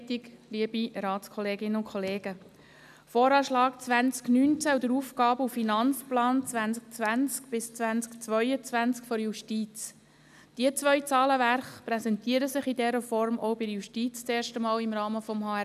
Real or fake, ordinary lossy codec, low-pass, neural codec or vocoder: real; none; 14.4 kHz; none